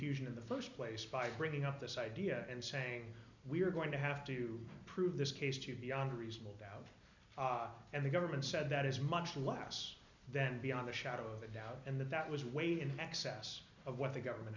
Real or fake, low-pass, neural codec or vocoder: real; 7.2 kHz; none